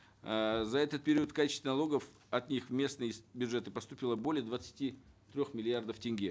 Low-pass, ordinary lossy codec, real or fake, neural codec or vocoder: none; none; real; none